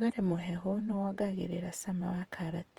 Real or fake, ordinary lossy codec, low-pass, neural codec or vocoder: fake; Opus, 24 kbps; 19.8 kHz; vocoder, 48 kHz, 128 mel bands, Vocos